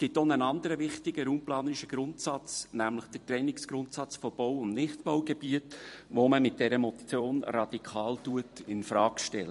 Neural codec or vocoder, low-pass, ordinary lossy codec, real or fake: vocoder, 44.1 kHz, 128 mel bands every 256 samples, BigVGAN v2; 14.4 kHz; MP3, 48 kbps; fake